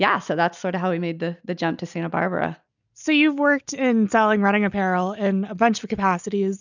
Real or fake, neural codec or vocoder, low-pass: real; none; 7.2 kHz